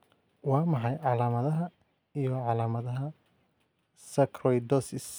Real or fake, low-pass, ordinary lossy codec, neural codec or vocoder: real; none; none; none